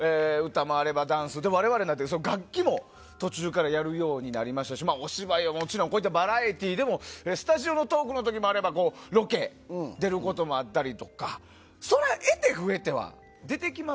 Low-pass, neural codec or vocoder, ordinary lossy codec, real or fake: none; none; none; real